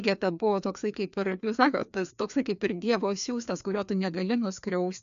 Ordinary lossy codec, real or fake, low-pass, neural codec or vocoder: MP3, 96 kbps; fake; 7.2 kHz; codec, 16 kHz, 2 kbps, FreqCodec, larger model